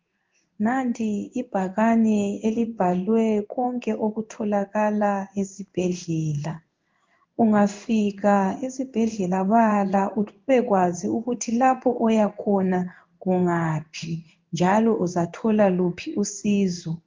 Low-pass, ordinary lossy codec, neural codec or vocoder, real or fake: 7.2 kHz; Opus, 32 kbps; codec, 16 kHz in and 24 kHz out, 1 kbps, XY-Tokenizer; fake